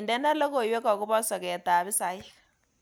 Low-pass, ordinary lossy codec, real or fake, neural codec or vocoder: none; none; real; none